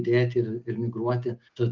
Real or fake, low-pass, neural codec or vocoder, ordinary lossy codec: real; 7.2 kHz; none; Opus, 24 kbps